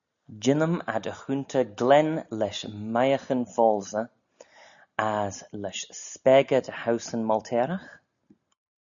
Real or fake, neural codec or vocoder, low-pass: real; none; 7.2 kHz